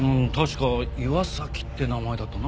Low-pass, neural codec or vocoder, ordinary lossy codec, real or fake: none; none; none; real